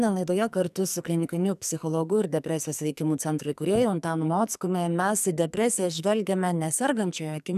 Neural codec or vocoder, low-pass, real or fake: codec, 44.1 kHz, 2.6 kbps, SNAC; 14.4 kHz; fake